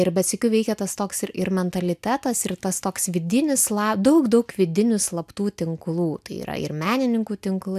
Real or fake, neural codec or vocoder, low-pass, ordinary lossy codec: real; none; 14.4 kHz; AAC, 96 kbps